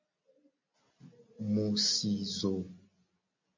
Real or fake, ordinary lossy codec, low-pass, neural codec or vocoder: real; MP3, 48 kbps; 7.2 kHz; none